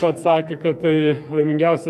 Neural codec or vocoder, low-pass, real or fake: codec, 44.1 kHz, 2.6 kbps, SNAC; 14.4 kHz; fake